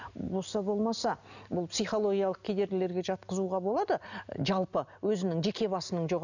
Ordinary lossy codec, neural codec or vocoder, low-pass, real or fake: none; none; 7.2 kHz; real